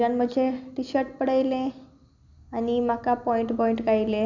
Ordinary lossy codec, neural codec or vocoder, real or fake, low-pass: none; none; real; 7.2 kHz